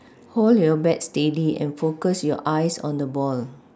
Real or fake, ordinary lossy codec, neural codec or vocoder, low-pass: real; none; none; none